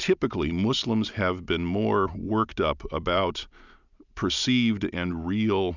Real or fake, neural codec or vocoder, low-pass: real; none; 7.2 kHz